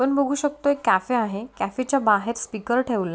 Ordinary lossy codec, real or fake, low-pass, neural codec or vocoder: none; real; none; none